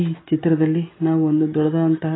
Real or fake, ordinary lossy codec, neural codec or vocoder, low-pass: real; AAC, 16 kbps; none; 7.2 kHz